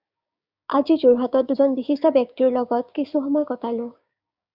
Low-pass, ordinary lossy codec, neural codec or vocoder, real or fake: 5.4 kHz; Opus, 64 kbps; codec, 16 kHz, 6 kbps, DAC; fake